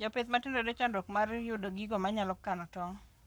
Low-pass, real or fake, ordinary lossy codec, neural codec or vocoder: none; fake; none; codec, 44.1 kHz, 7.8 kbps, Pupu-Codec